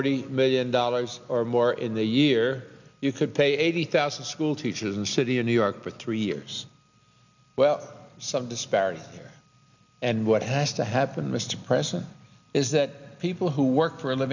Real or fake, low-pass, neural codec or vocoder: real; 7.2 kHz; none